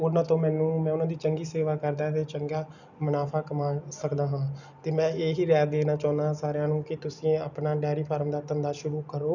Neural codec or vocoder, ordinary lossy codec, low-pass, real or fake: none; none; 7.2 kHz; real